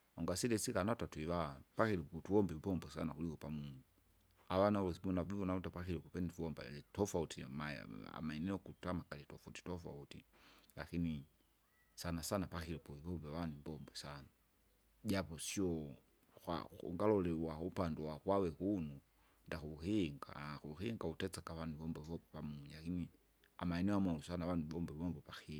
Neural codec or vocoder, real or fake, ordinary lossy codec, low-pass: none; real; none; none